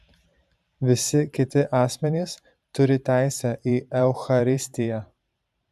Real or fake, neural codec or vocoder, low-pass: fake; vocoder, 44.1 kHz, 128 mel bands every 512 samples, BigVGAN v2; 14.4 kHz